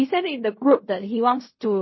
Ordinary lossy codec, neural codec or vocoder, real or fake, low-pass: MP3, 24 kbps; codec, 16 kHz in and 24 kHz out, 0.4 kbps, LongCat-Audio-Codec, fine tuned four codebook decoder; fake; 7.2 kHz